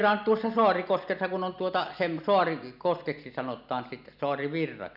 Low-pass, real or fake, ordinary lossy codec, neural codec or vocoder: 5.4 kHz; real; none; none